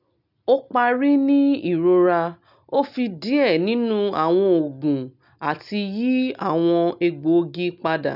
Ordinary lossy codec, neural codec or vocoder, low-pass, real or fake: none; none; 5.4 kHz; real